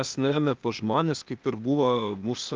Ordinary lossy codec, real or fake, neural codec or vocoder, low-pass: Opus, 24 kbps; fake; codec, 16 kHz, 0.8 kbps, ZipCodec; 7.2 kHz